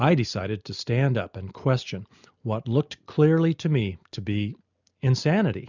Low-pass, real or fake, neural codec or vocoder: 7.2 kHz; real; none